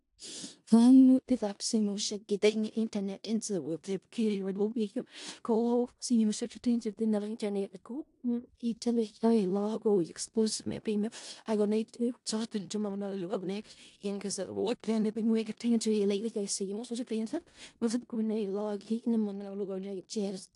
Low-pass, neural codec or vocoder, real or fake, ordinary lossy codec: 10.8 kHz; codec, 16 kHz in and 24 kHz out, 0.4 kbps, LongCat-Audio-Codec, four codebook decoder; fake; AAC, 64 kbps